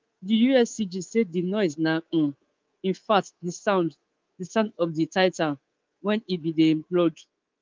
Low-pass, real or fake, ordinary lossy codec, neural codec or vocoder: 7.2 kHz; fake; Opus, 32 kbps; codec, 24 kHz, 3.1 kbps, DualCodec